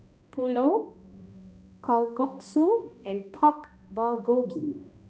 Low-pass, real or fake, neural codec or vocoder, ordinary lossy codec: none; fake; codec, 16 kHz, 1 kbps, X-Codec, HuBERT features, trained on balanced general audio; none